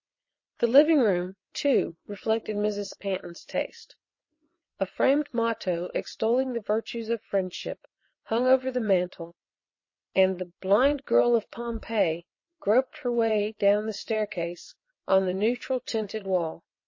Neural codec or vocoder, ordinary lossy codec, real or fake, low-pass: vocoder, 22.05 kHz, 80 mel bands, WaveNeXt; MP3, 32 kbps; fake; 7.2 kHz